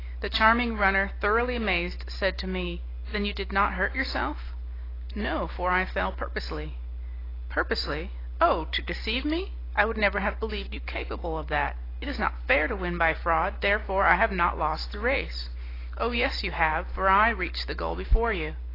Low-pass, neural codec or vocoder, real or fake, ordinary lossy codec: 5.4 kHz; none; real; AAC, 24 kbps